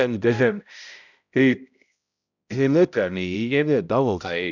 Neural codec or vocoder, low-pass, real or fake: codec, 16 kHz, 0.5 kbps, X-Codec, HuBERT features, trained on balanced general audio; 7.2 kHz; fake